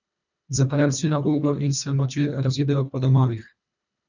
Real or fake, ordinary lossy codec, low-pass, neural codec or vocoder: fake; none; 7.2 kHz; codec, 24 kHz, 1.5 kbps, HILCodec